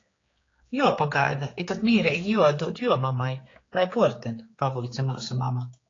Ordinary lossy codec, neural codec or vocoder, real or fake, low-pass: AAC, 32 kbps; codec, 16 kHz, 4 kbps, X-Codec, HuBERT features, trained on general audio; fake; 7.2 kHz